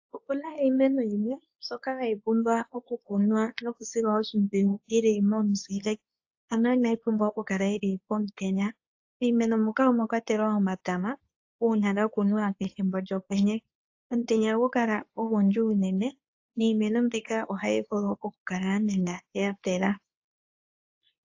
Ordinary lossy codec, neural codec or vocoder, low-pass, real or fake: AAC, 48 kbps; codec, 24 kHz, 0.9 kbps, WavTokenizer, medium speech release version 2; 7.2 kHz; fake